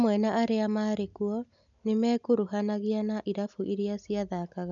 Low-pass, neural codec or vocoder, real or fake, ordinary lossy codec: 7.2 kHz; none; real; none